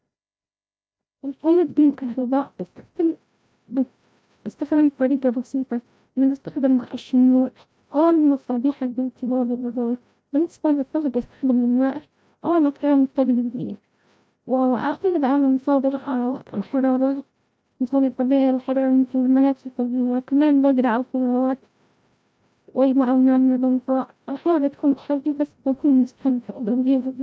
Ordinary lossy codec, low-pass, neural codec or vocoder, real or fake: none; none; codec, 16 kHz, 0.5 kbps, FreqCodec, larger model; fake